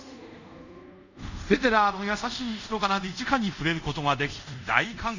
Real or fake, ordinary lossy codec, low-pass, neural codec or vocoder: fake; none; 7.2 kHz; codec, 24 kHz, 0.5 kbps, DualCodec